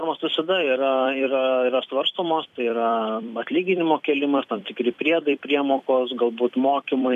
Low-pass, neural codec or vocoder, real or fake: 14.4 kHz; vocoder, 44.1 kHz, 128 mel bands every 256 samples, BigVGAN v2; fake